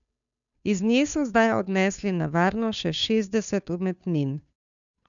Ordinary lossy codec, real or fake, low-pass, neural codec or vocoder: none; fake; 7.2 kHz; codec, 16 kHz, 2 kbps, FunCodec, trained on Chinese and English, 25 frames a second